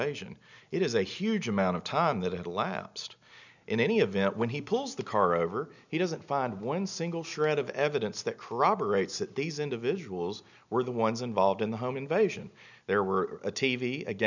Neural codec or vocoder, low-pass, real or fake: none; 7.2 kHz; real